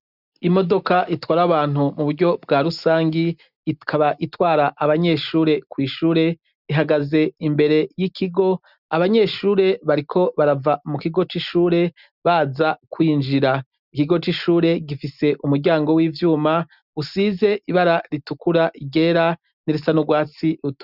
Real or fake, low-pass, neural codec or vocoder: real; 5.4 kHz; none